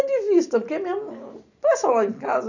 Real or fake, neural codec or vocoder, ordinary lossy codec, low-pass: real; none; none; 7.2 kHz